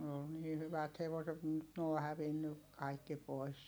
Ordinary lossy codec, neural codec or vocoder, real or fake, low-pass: none; none; real; none